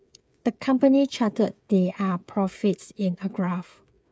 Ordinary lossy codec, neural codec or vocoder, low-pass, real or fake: none; codec, 16 kHz, 8 kbps, FreqCodec, smaller model; none; fake